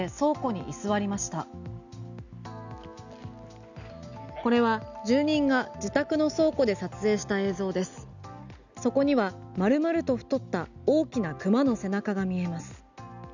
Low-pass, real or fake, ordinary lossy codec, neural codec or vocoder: 7.2 kHz; real; none; none